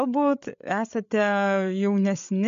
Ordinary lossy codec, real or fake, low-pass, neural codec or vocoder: MP3, 64 kbps; fake; 7.2 kHz; codec, 16 kHz, 8 kbps, FreqCodec, larger model